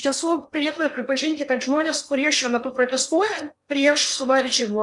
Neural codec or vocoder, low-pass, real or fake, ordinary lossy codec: codec, 16 kHz in and 24 kHz out, 0.8 kbps, FocalCodec, streaming, 65536 codes; 10.8 kHz; fake; MP3, 96 kbps